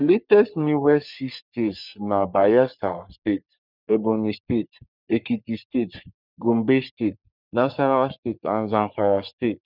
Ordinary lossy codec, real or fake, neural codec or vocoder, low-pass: none; fake; codec, 44.1 kHz, 3.4 kbps, Pupu-Codec; 5.4 kHz